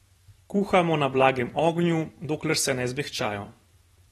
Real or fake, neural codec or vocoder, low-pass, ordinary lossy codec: fake; vocoder, 44.1 kHz, 128 mel bands every 512 samples, BigVGAN v2; 19.8 kHz; AAC, 32 kbps